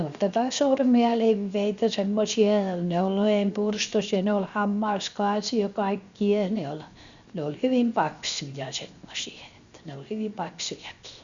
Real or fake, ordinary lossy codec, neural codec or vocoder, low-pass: fake; Opus, 64 kbps; codec, 16 kHz, 0.7 kbps, FocalCodec; 7.2 kHz